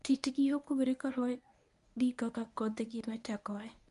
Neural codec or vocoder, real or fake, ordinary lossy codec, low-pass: codec, 24 kHz, 0.9 kbps, WavTokenizer, medium speech release version 1; fake; none; 10.8 kHz